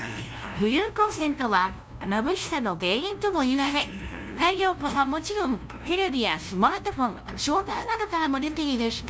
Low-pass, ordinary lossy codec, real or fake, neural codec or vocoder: none; none; fake; codec, 16 kHz, 0.5 kbps, FunCodec, trained on LibriTTS, 25 frames a second